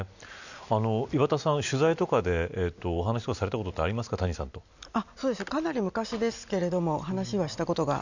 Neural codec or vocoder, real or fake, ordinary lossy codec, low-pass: none; real; none; 7.2 kHz